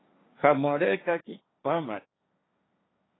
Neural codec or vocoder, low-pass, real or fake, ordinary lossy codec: codec, 16 kHz, 1.1 kbps, Voila-Tokenizer; 7.2 kHz; fake; AAC, 16 kbps